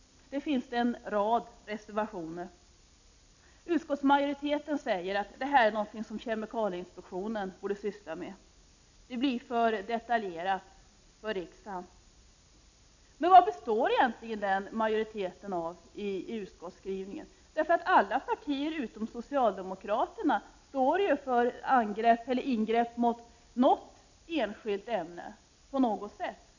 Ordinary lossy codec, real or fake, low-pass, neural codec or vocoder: none; real; 7.2 kHz; none